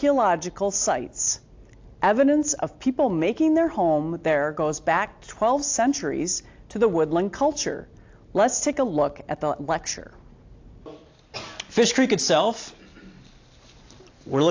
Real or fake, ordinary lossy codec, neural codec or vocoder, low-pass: real; AAC, 48 kbps; none; 7.2 kHz